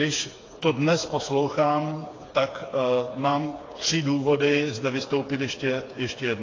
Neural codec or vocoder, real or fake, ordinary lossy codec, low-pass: codec, 16 kHz, 4 kbps, FreqCodec, smaller model; fake; AAC, 32 kbps; 7.2 kHz